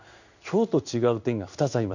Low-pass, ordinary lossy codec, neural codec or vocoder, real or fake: 7.2 kHz; none; codec, 16 kHz in and 24 kHz out, 1 kbps, XY-Tokenizer; fake